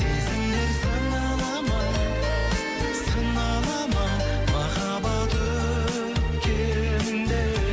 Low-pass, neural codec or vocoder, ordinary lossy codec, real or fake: none; none; none; real